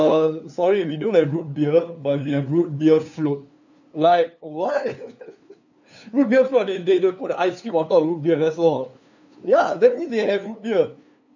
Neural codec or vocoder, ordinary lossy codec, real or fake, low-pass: codec, 16 kHz, 2 kbps, FunCodec, trained on LibriTTS, 25 frames a second; none; fake; 7.2 kHz